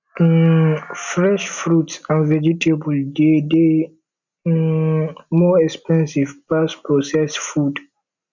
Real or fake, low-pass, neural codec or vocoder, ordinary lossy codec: real; 7.2 kHz; none; none